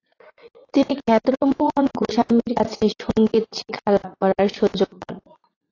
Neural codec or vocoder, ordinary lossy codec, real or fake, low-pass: vocoder, 44.1 kHz, 80 mel bands, Vocos; AAC, 32 kbps; fake; 7.2 kHz